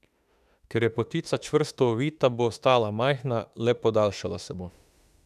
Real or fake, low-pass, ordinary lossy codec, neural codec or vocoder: fake; 14.4 kHz; none; autoencoder, 48 kHz, 32 numbers a frame, DAC-VAE, trained on Japanese speech